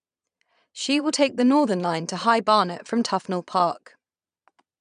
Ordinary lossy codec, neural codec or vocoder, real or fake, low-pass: none; vocoder, 22.05 kHz, 80 mel bands, Vocos; fake; 9.9 kHz